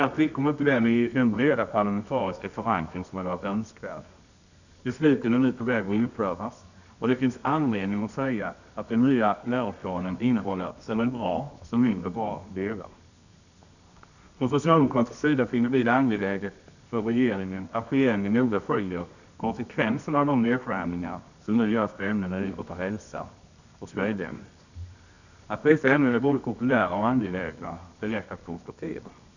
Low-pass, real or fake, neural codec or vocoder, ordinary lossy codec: 7.2 kHz; fake; codec, 24 kHz, 0.9 kbps, WavTokenizer, medium music audio release; none